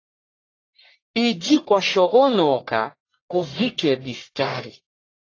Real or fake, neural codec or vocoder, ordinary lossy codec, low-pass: fake; codec, 44.1 kHz, 1.7 kbps, Pupu-Codec; AAC, 32 kbps; 7.2 kHz